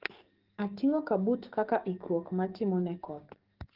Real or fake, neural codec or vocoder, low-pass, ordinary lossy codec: fake; codec, 44.1 kHz, 7.8 kbps, DAC; 5.4 kHz; Opus, 16 kbps